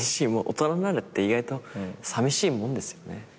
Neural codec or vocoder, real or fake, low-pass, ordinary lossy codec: none; real; none; none